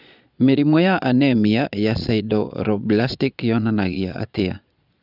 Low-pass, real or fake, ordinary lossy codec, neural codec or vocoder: 5.4 kHz; fake; none; vocoder, 22.05 kHz, 80 mel bands, Vocos